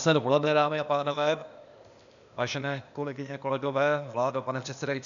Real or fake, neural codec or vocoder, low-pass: fake; codec, 16 kHz, 0.8 kbps, ZipCodec; 7.2 kHz